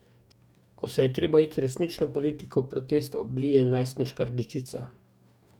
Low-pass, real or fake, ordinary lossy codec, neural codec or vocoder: 19.8 kHz; fake; none; codec, 44.1 kHz, 2.6 kbps, DAC